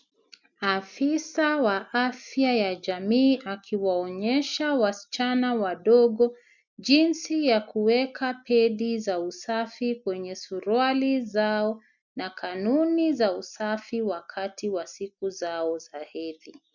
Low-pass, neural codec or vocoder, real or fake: 7.2 kHz; none; real